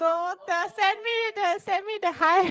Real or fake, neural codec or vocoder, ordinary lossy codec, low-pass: fake; codec, 16 kHz, 8 kbps, FreqCodec, larger model; none; none